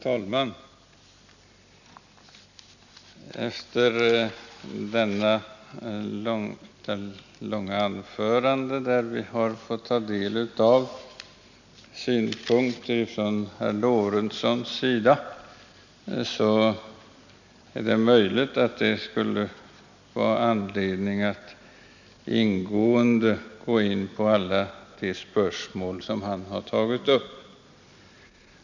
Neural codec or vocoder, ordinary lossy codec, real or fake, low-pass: none; none; real; 7.2 kHz